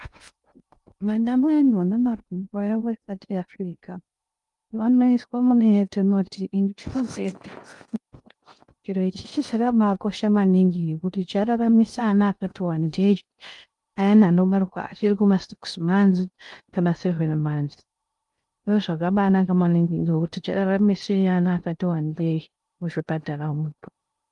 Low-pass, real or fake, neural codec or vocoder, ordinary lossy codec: 10.8 kHz; fake; codec, 16 kHz in and 24 kHz out, 0.6 kbps, FocalCodec, streaming, 2048 codes; Opus, 32 kbps